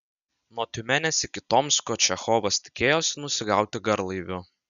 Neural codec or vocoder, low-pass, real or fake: none; 7.2 kHz; real